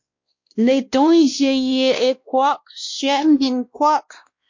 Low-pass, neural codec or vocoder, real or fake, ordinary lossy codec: 7.2 kHz; codec, 16 kHz, 1 kbps, X-Codec, WavLM features, trained on Multilingual LibriSpeech; fake; MP3, 48 kbps